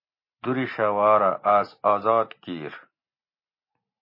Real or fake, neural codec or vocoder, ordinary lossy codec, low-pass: real; none; MP3, 24 kbps; 5.4 kHz